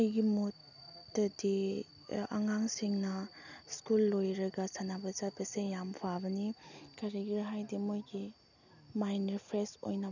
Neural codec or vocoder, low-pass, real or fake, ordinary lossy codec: none; 7.2 kHz; real; none